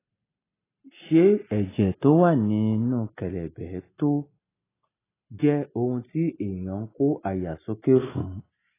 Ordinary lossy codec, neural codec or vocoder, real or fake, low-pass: AAC, 16 kbps; none; real; 3.6 kHz